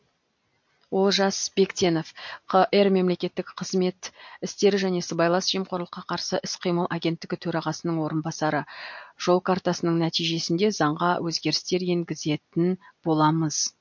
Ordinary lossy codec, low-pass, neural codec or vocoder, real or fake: MP3, 48 kbps; 7.2 kHz; none; real